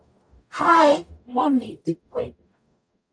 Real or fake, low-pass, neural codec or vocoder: fake; 9.9 kHz; codec, 44.1 kHz, 0.9 kbps, DAC